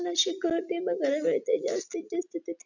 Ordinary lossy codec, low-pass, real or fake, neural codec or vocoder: none; 7.2 kHz; real; none